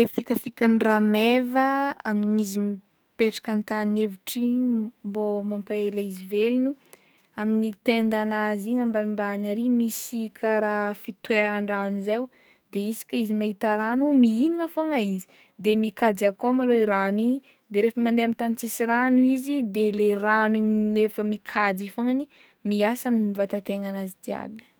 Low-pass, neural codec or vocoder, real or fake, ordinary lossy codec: none; codec, 44.1 kHz, 2.6 kbps, SNAC; fake; none